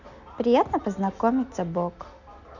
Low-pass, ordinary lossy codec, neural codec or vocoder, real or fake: 7.2 kHz; none; none; real